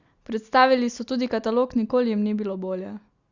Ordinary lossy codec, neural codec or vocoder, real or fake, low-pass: Opus, 64 kbps; none; real; 7.2 kHz